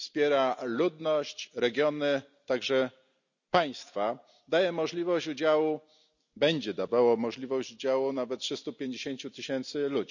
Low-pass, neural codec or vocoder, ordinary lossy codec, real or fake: 7.2 kHz; none; none; real